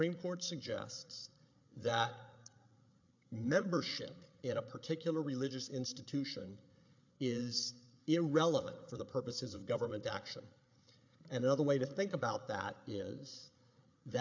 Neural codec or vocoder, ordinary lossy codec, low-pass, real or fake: codec, 16 kHz, 8 kbps, FreqCodec, larger model; MP3, 64 kbps; 7.2 kHz; fake